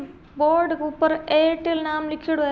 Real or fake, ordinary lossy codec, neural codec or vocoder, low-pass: real; none; none; none